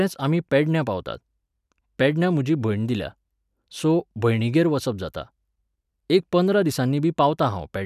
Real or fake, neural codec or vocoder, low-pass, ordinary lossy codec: real; none; 14.4 kHz; none